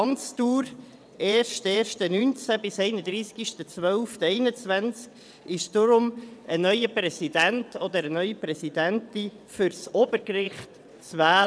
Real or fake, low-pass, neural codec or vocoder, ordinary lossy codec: fake; none; vocoder, 22.05 kHz, 80 mel bands, WaveNeXt; none